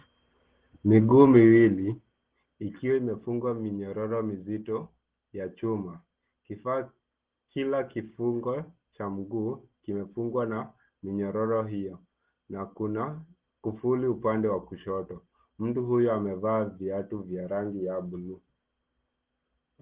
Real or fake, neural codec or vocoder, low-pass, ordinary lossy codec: real; none; 3.6 kHz; Opus, 16 kbps